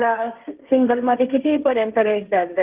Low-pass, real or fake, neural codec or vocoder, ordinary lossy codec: 3.6 kHz; fake; codec, 16 kHz, 1.1 kbps, Voila-Tokenizer; Opus, 32 kbps